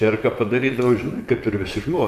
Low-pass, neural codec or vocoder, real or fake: 14.4 kHz; vocoder, 44.1 kHz, 128 mel bands, Pupu-Vocoder; fake